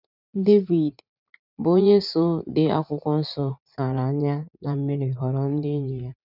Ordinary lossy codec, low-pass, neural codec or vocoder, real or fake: none; 5.4 kHz; vocoder, 44.1 kHz, 80 mel bands, Vocos; fake